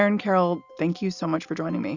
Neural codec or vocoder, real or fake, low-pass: none; real; 7.2 kHz